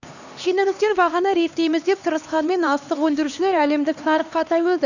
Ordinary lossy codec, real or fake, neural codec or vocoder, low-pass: none; fake; codec, 16 kHz, 2 kbps, X-Codec, HuBERT features, trained on LibriSpeech; 7.2 kHz